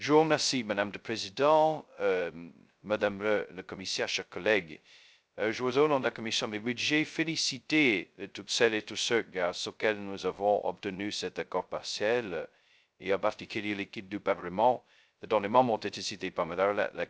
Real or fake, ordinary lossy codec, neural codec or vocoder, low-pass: fake; none; codec, 16 kHz, 0.2 kbps, FocalCodec; none